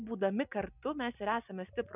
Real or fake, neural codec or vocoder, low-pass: real; none; 3.6 kHz